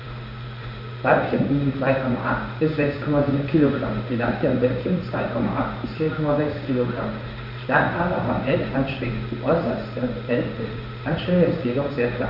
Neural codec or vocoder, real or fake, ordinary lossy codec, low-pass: codec, 16 kHz in and 24 kHz out, 1 kbps, XY-Tokenizer; fake; none; 5.4 kHz